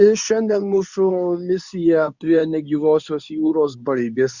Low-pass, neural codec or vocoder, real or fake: 7.2 kHz; codec, 24 kHz, 0.9 kbps, WavTokenizer, medium speech release version 2; fake